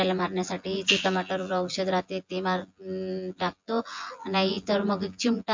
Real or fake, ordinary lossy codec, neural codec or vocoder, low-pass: fake; MP3, 48 kbps; vocoder, 24 kHz, 100 mel bands, Vocos; 7.2 kHz